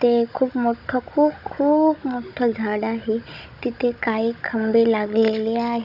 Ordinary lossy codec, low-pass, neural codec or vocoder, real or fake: none; 5.4 kHz; codec, 16 kHz, 16 kbps, FunCodec, trained on Chinese and English, 50 frames a second; fake